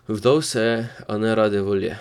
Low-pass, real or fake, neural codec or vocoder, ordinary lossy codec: 19.8 kHz; fake; vocoder, 44.1 kHz, 128 mel bands every 256 samples, BigVGAN v2; none